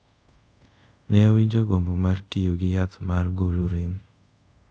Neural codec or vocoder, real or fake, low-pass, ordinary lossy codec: codec, 24 kHz, 0.5 kbps, DualCodec; fake; 9.9 kHz; none